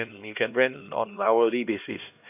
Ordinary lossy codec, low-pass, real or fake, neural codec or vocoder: none; 3.6 kHz; fake; codec, 16 kHz, 1 kbps, X-Codec, HuBERT features, trained on LibriSpeech